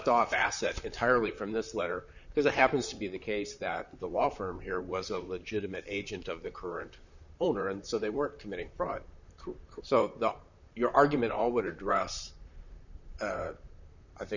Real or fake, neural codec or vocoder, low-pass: fake; vocoder, 44.1 kHz, 128 mel bands, Pupu-Vocoder; 7.2 kHz